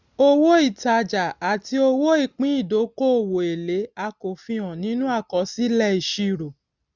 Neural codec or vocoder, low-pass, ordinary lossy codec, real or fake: none; 7.2 kHz; none; real